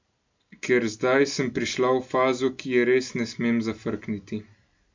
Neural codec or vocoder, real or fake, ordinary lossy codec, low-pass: none; real; MP3, 64 kbps; 7.2 kHz